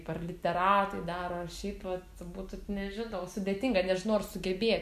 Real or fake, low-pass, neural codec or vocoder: real; 14.4 kHz; none